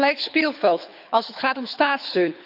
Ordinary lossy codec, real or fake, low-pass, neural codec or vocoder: none; fake; 5.4 kHz; codec, 24 kHz, 6 kbps, HILCodec